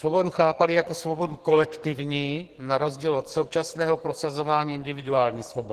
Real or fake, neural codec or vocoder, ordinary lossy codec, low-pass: fake; codec, 44.1 kHz, 2.6 kbps, SNAC; Opus, 16 kbps; 14.4 kHz